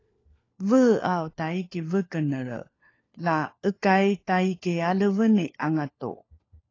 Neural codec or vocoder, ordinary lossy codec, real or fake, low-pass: codec, 16 kHz, 4 kbps, FunCodec, trained on LibriTTS, 50 frames a second; AAC, 32 kbps; fake; 7.2 kHz